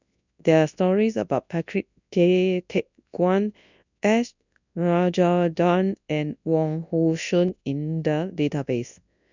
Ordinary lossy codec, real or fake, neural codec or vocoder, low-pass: none; fake; codec, 24 kHz, 0.9 kbps, WavTokenizer, large speech release; 7.2 kHz